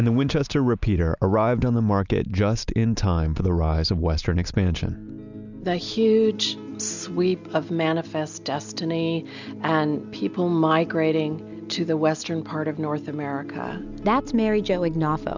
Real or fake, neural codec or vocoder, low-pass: real; none; 7.2 kHz